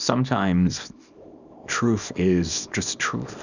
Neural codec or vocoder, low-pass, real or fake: codec, 24 kHz, 0.9 kbps, WavTokenizer, small release; 7.2 kHz; fake